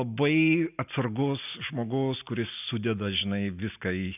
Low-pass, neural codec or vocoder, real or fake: 3.6 kHz; none; real